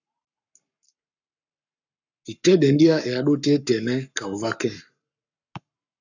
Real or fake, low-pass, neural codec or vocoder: fake; 7.2 kHz; codec, 44.1 kHz, 7.8 kbps, Pupu-Codec